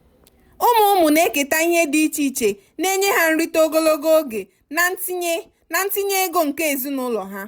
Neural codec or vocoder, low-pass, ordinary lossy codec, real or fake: none; none; none; real